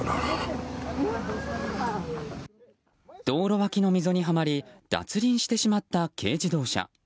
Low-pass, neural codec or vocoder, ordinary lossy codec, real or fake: none; none; none; real